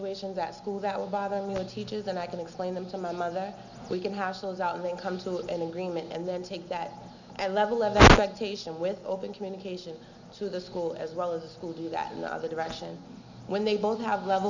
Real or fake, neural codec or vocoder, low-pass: real; none; 7.2 kHz